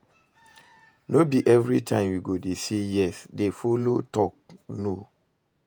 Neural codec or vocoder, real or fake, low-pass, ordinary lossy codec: vocoder, 48 kHz, 128 mel bands, Vocos; fake; none; none